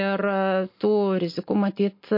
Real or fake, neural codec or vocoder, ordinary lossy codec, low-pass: real; none; MP3, 32 kbps; 5.4 kHz